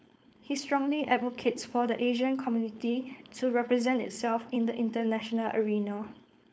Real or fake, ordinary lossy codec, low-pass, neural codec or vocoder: fake; none; none; codec, 16 kHz, 4.8 kbps, FACodec